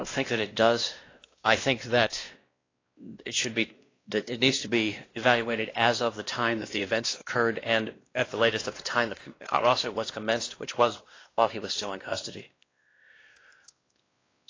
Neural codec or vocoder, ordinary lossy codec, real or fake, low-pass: codec, 16 kHz, 1 kbps, X-Codec, WavLM features, trained on Multilingual LibriSpeech; AAC, 32 kbps; fake; 7.2 kHz